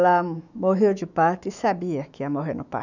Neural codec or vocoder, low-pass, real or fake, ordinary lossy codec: autoencoder, 48 kHz, 128 numbers a frame, DAC-VAE, trained on Japanese speech; 7.2 kHz; fake; none